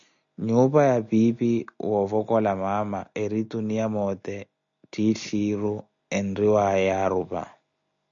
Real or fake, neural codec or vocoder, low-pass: real; none; 7.2 kHz